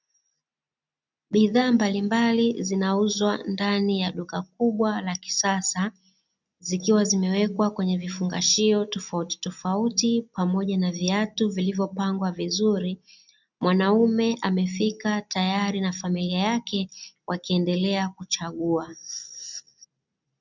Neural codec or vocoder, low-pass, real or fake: none; 7.2 kHz; real